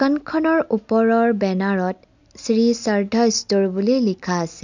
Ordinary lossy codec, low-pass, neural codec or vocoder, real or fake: none; 7.2 kHz; none; real